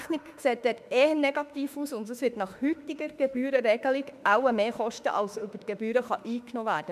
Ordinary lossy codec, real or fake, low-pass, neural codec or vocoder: none; fake; 14.4 kHz; autoencoder, 48 kHz, 32 numbers a frame, DAC-VAE, trained on Japanese speech